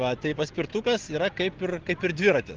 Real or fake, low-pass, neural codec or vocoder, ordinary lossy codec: real; 7.2 kHz; none; Opus, 16 kbps